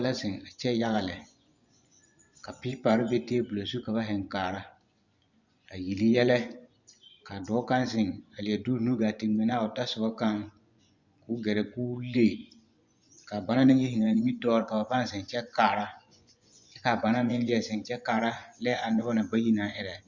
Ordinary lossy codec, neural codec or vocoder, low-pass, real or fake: Opus, 64 kbps; vocoder, 24 kHz, 100 mel bands, Vocos; 7.2 kHz; fake